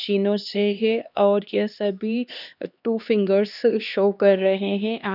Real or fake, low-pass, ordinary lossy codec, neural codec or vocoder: fake; 5.4 kHz; none; codec, 16 kHz, 2 kbps, X-Codec, HuBERT features, trained on LibriSpeech